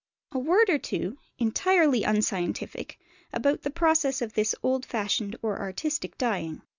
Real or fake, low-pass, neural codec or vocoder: real; 7.2 kHz; none